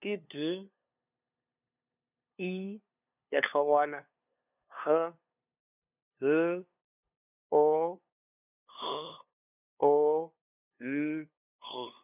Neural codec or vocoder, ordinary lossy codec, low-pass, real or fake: codec, 16 kHz, 2 kbps, FunCodec, trained on LibriTTS, 25 frames a second; none; 3.6 kHz; fake